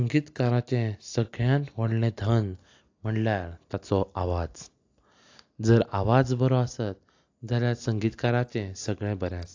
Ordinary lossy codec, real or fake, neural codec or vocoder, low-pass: AAC, 48 kbps; real; none; 7.2 kHz